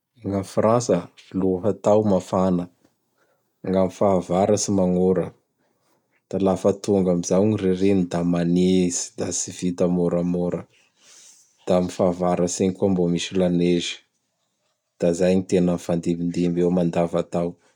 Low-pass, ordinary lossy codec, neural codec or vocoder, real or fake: 19.8 kHz; none; none; real